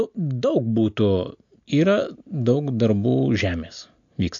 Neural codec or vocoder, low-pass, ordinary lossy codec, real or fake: none; 7.2 kHz; AAC, 64 kbps; real